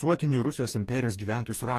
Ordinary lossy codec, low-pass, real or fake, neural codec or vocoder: AAC, 64 kbps; 14.4 kHz; fake; codec, 44.1 kHz, 2.6 kbps, DAC